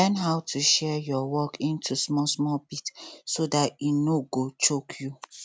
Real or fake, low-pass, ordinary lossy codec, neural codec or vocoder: real; none; none; none